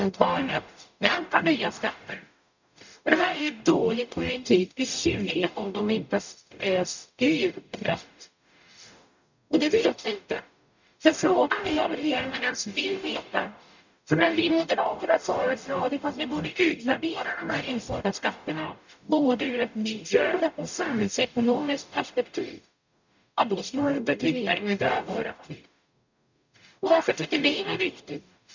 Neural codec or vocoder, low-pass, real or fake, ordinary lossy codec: codec, 44.1 kHz, 0.9 kbps, DAC; 7.2 kHz; fake; none